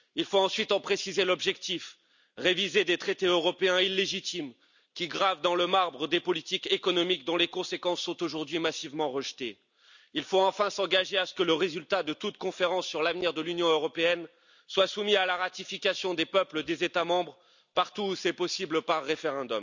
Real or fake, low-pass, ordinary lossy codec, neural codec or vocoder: real; 7.2 kHz; none; none